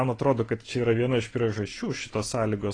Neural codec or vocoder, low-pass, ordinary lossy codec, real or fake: none; 9.9 kHz; AAC, 32 kbps; real